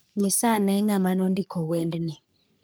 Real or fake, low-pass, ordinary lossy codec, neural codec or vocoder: fake; none; none; codec, 44.1 kHz, 3.4 kbps, Pupu-Codec